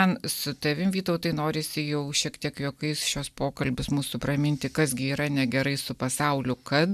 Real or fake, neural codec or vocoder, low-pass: real; none; 14.4 kHz